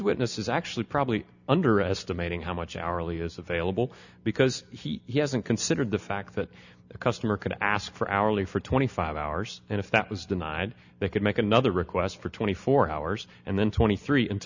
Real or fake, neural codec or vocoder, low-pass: real; none; 7.2 kHz